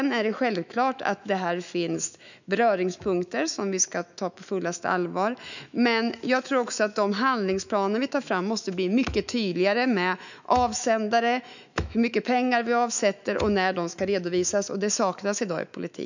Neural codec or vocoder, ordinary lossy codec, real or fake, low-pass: autoencoder, 48 kHz, 128 numbers a frame, DAC-VAE, trained on Japanese speech; none; fake; 7.2 kHz